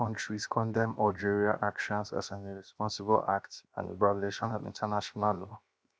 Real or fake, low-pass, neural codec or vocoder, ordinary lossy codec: fake; none; codec, 16 kHz, 0.7 kbps, FocalCodec; none